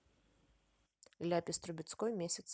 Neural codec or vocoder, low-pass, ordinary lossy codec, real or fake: none; none; none; real